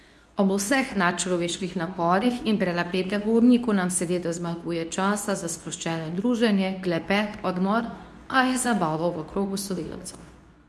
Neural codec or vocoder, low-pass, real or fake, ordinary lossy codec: codec, 24 kHz, 0.9 kbps, WavTokenizer, medium speech release version 2; none; fake; none